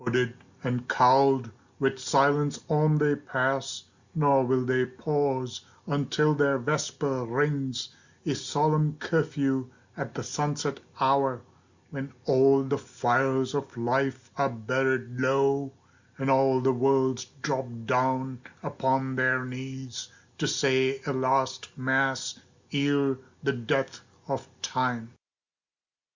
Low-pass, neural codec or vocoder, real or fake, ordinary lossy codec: 7.2 kHz; none; real; Opus, 64 kbps